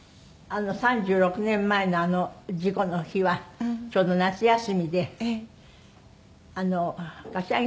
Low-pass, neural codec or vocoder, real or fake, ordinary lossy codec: none; none; real; none